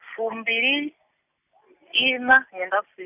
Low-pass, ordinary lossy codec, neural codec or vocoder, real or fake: 3.6 kHz; none; none; real